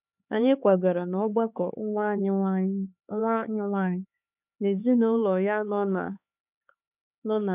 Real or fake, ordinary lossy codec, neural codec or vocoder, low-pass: fake; none; codec, 16 kHz, 4 kbps, X-Codec, HuBERT features, trained on LibriSpeech; 3.6 kHz